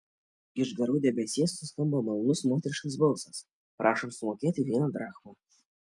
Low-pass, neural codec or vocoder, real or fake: 10.8 kHz; none; real